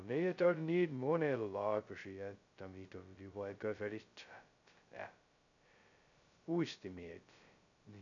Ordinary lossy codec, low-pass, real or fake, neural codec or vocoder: none; 7.2 kHz; fake; codec, 16 kHz, 0.2 kbps, FocalCodec